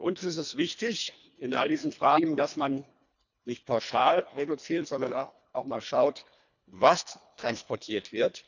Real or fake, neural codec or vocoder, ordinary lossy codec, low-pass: fake; codec, 24 kHz, 1.5 kbps, HILCodec; none; 7.2 kHz